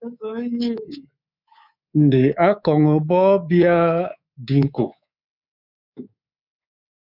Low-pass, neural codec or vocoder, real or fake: 5.4 kHz; codec, 24 kHz, 3.1 kbps, DualCodec; fake